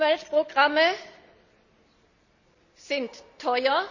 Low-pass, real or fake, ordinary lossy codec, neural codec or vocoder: 7.2 kHz; real; none; none